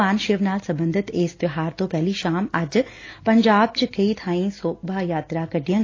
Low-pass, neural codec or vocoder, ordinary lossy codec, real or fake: 7.2 kHz; none; AAC, 32 kbps; real